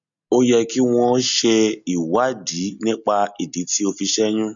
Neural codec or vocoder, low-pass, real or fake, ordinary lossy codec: none; 7.2 kHz; real; none